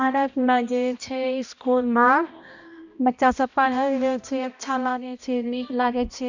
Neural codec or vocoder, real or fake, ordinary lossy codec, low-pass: codec, 16 kHz, 1 kbps, X-Codec, HuBERT features, trained on balanced general audio; fake; none; 7.2 kHz